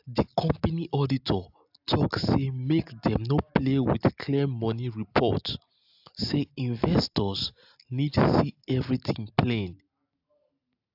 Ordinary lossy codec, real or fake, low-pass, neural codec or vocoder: AAC, 48 kbps; real; 5.4 kHz; none